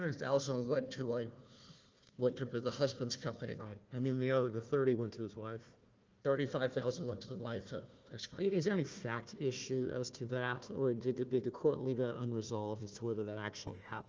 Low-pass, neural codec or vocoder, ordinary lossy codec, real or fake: 7.2 kHz; codec, 16 kHz, 1 kbps, FunCodec, trained on Chinese and English, 50 frames a second; Opus, 24 kbps; fake